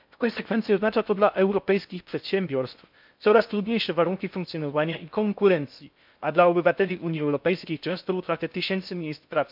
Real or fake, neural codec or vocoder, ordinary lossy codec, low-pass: fake; codec, 16 kHz in and 24 kHz out, 0.6 kbps, FocalCodec, streaming, 4096 codes; MP3, 48 kbps; 5.4 kHz